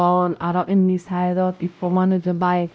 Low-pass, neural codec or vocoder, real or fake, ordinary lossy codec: none; codec, 16 kHz, 0.5 kbps, X-Codec, WavLM features, trained on Multilingual LibriSpeech; fake; none